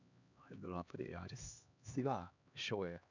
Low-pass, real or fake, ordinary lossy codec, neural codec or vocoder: 7.2 kHz; fake; none; codec, 16 kHz, 2 kbps, X-Codec, HuBERT features, trained on LibriSpeech